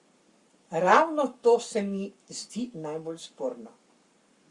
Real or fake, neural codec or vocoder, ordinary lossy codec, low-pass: fake; codec, 44.1 kHz, 7.8 kbps, Pupu-Codec; Opus, 64 kbps; 10.8 kHz